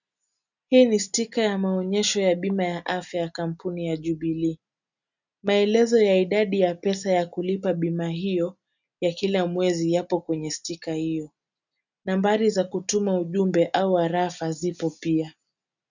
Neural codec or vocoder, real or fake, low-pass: none; real; 7.2 kHz